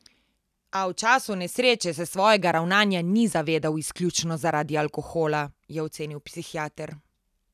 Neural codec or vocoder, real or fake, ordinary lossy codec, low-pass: none; real; none; 14.4 kHz